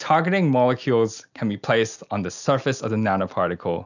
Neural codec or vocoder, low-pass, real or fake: none; 7.2 kHz; real